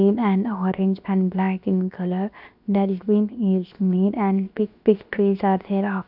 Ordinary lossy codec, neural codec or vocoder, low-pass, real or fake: none; codec, 16 kHz, 0.7 kbps, FocalCodec; 5.4 kHz; fake